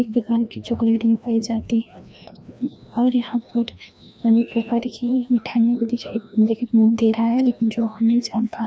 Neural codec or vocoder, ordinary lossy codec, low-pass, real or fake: codec, 16 kHz, 1 kbps, FreqCodec, larger model; none; none; fake